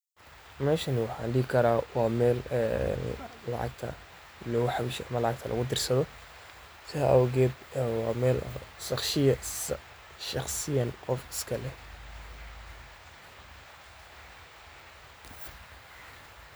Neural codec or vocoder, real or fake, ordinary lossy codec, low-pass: none; real; none; none